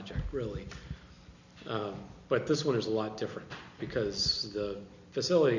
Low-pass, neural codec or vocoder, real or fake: 7.2 kHz; none; real